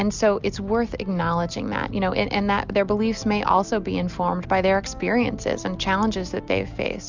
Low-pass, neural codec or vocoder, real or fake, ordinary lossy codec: 7.2 kHz; none; real; Opus, 64 kbps